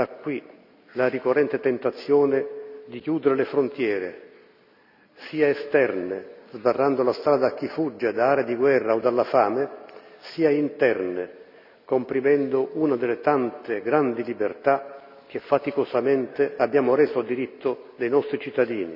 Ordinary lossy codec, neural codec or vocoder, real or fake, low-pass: none; none; real; 5.4 kHz